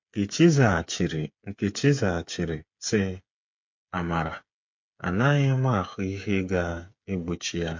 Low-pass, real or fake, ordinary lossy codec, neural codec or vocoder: 7.2 kHz; fake; MP3, 48 kbps; codec, 16 kHz, 8 kbps, FreqCodec, smaller model